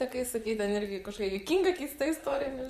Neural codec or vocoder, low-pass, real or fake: vocoder, 44.1 kHz, 128 mel bands, Pupu-Vocoder; 14.4 kHz; fake